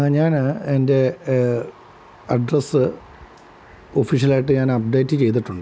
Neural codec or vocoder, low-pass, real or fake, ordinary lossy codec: none; none; real; none